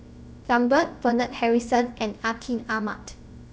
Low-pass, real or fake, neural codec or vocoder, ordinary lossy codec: none; fake; codec, 16 kHz, about 1 kbps, DyCAST, with the encoder's durations; none